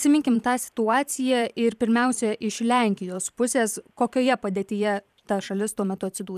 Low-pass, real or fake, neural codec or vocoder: 14.4 kHz; fake; vocoder, 44.1 kHz, 128 mel bands every 512 samples, BigVGAN v2